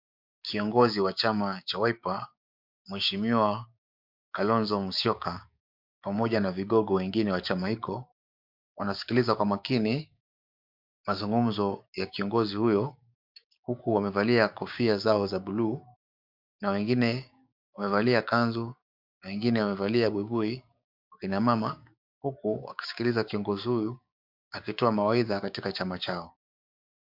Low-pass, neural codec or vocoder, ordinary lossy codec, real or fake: 5.4 kHz; codec, 16 kHz, 6 kbps, DAC; MP3, 48 kbps; fake